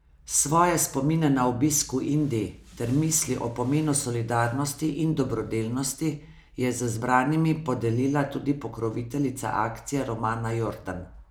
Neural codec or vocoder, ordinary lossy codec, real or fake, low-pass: none; none; real; none